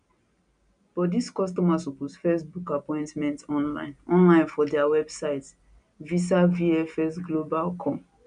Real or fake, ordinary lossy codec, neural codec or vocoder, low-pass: real; none; none; 9.9 kHz